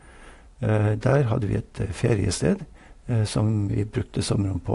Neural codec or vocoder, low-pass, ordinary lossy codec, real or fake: none; 10.8 kHz; AAC, 48 kbps; real